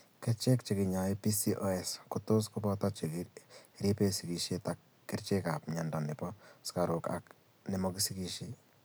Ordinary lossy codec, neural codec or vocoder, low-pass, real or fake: none; none; none; real